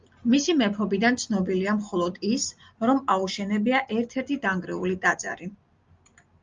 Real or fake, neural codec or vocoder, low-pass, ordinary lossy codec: real; none; 7.2 kHz; Opus, 32 kbps